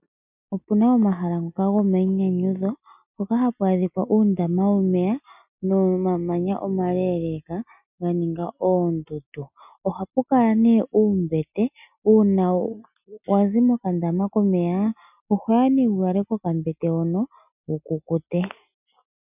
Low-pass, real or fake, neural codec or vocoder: 3.6 kHz; real; none